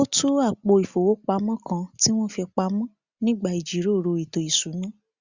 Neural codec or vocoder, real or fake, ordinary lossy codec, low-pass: none; real; Opus, 64 kbps; 7.2 kHz